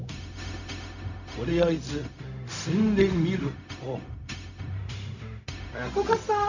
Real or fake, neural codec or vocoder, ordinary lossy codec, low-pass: fake; codec, 16 kHz, 0.4 kbps, LongCat-Audio-Codec; none; 7.2 kHz